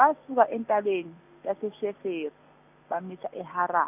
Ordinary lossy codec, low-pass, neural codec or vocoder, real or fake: none; 3.6 kHz; none; real